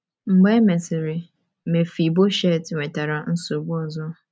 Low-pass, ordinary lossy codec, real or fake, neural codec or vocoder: none; none; real; none